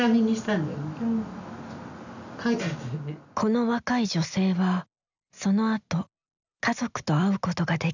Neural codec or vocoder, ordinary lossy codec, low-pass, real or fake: none; none; 7.2 kHz; real